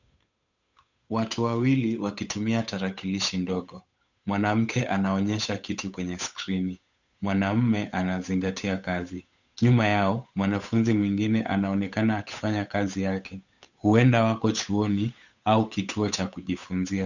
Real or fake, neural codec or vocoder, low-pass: fake; codec, 16 kHz, 8 kbps, FunCodec, trained on Chinese and English, 25 frames a second; 7.2 kHz